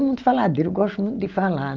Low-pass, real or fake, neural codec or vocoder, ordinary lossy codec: 7.2 kHz; real; none; Opus, 24 kbps